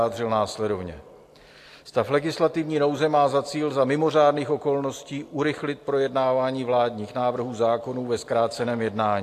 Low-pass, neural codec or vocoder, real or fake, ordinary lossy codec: 14.4 kHz; none; real; AAC, 64 kbps